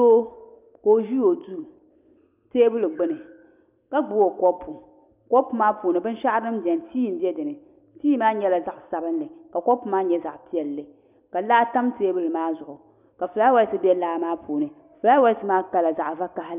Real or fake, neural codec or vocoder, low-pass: real; none; 3.6 kHz